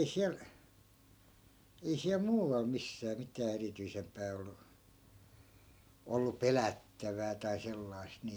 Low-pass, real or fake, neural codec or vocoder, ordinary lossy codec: none; real; none; none